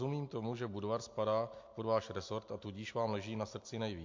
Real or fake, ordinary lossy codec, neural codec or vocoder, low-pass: real; MP3, 48 kbps; none; 7.2 kHz